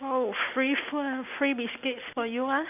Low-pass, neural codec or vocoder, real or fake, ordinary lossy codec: 3.6 kHz; none; real; none